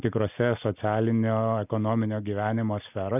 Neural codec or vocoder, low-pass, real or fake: none; 3.6 kHz; real